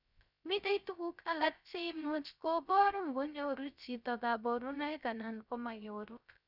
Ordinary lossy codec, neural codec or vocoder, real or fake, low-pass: none; codec, 16 kHz, 0.3 kbps, FocalCodec; fake; 5.4 kHz